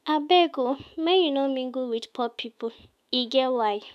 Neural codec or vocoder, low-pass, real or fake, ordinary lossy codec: autoencoder, 48 kHz, 128 numbers a frame, DAC-VAE, trained on Japanese speech; 14.4 kHz; fake; none